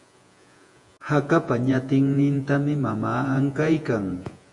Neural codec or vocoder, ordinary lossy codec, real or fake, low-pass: vocoder, 48 kHz, 128 mel bands, Vocos; Opus, 64 kbps; fake; 10.8 kHz